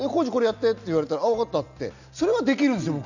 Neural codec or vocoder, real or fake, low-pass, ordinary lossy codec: none; real; 7.2 kHz; none